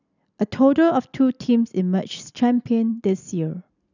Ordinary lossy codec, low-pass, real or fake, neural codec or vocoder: none; 7.2 kHz; real; none